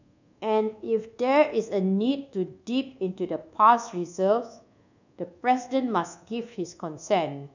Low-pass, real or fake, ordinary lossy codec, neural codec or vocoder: 7.2 kHz; fake; none; codec, 24 kHz, 1.2 kbps, DualCodec